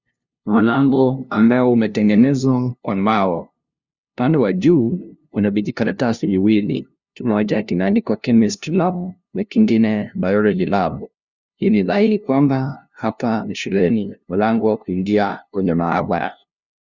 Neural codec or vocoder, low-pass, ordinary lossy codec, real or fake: codec, 16 kHz, 0.5 kbps, FunCodec, trained on LibriTTS, 25 frames a second; 7.2 kHz; Opus, 64 kbps; fake